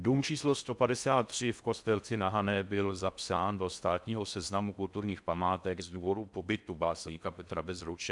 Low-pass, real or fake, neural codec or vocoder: 10.8 kHz; fake; codec, 16 kHz in and 24 kHz out, 0.6 kbps, FocalCodec, streaming, 4096 codes